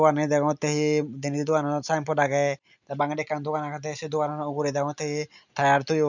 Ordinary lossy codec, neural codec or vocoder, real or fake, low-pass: none; none; real; 7.2 kHz